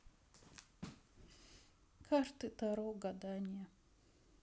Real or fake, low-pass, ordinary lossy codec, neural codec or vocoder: real; none; none; none